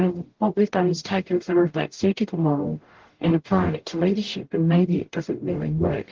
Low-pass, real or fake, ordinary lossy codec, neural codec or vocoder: 7.2 kHz; fake; Opus, 16 kbps; codec, 44.1 kHz, 0.9 kbps, DAC